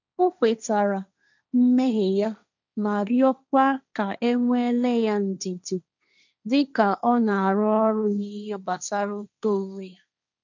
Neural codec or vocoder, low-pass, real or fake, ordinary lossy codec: codec, 16 kHz, 1.1 kbps, Voila-Tokenizer; 7.2 kHz; fake; none